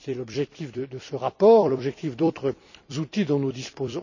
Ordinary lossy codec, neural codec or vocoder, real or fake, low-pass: none; vocoder, 44.1 kHz, 128 mel bands every 256 samples, BigVGAN v2; fake; 7.2 kHz